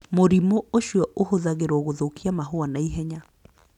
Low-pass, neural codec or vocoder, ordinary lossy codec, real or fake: 19.8 kHz; none; none; real